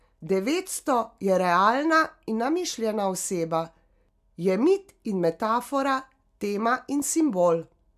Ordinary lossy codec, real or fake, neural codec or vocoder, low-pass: MP3, 96 kbps; real; none; 14.4 kHz